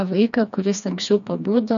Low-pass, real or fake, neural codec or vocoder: 7.2 kHz; fake; codec, 16 kHz, 2 kbps, FreqCodec, smaller model